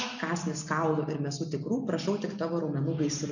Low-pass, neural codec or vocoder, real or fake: 7.2 kHz; none; real